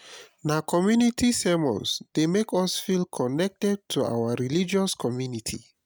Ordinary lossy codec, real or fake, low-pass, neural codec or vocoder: none; real; none; none